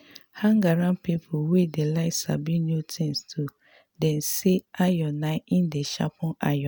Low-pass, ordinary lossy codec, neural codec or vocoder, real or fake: none; none; none; real